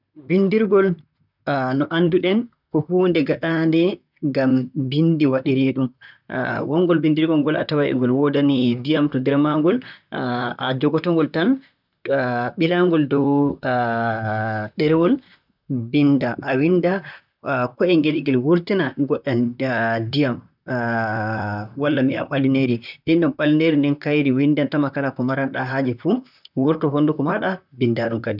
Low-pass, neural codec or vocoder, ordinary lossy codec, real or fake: 5.4 kHz; vocoder, 44.1 kHz, 128 mel bands, Pupu-Vocoder; none; fake